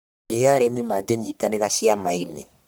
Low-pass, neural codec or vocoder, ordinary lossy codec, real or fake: none; codec, 44.1 kHz, 1.7 kbps, Pupu-Codec; none; fake